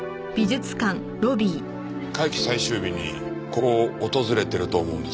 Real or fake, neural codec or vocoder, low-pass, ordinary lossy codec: real; none; none; none